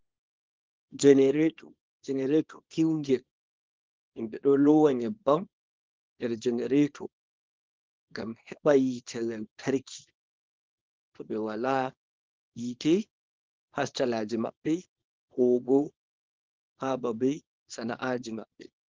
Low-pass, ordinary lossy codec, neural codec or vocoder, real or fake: 7.2 kHz; Opus, 16 kbps; codec, 24 kHz, 0.9 kbps, WavTokenizer, small release; fake